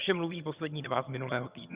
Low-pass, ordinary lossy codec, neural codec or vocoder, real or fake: 3.6 kHz; Opus, 64 kbps; vocoder, 22.05 kHz, 80 mel bands, HiFi-GAN; fake